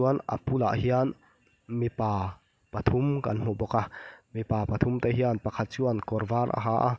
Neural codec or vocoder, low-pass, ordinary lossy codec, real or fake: none; none; none; real